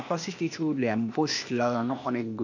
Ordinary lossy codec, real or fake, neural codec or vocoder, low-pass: none; fake; codec, 16 kHz, 0.8 kbps, ZipCodec; 7.2 kHz